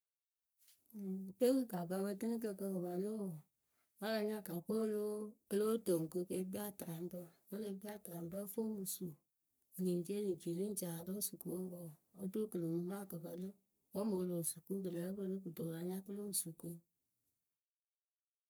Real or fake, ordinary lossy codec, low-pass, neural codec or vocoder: fake; none; none; codec, 44.1 kHz, 3.4 kbps, Pupu-Codec